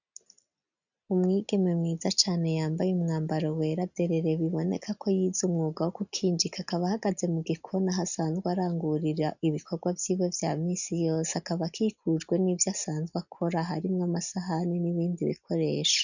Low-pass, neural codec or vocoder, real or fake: 7.2 kHz; none; real